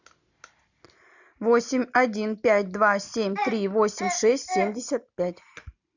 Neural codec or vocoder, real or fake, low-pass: none; real; 7.2 kHz